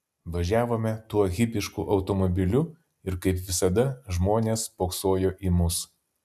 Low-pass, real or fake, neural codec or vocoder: 14.4 kHz; real; none